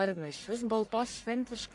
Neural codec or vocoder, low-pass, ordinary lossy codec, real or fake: codec, 44.1 kHz, 1.7 kbps, Pupu-Codec; 10.8 kHz; AAC, 64 kbps; fake